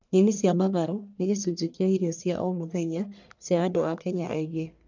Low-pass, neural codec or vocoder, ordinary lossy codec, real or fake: 7.2 kHz; codec, 44.1 kHz, 1.7 kbps, Pupu-Codec; none; fake